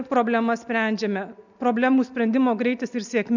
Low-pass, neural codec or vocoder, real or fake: 7.2 kHz; none; real